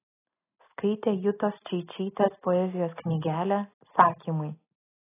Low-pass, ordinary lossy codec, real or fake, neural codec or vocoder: 3.6 kHz; AAC, 16 kbps; real; none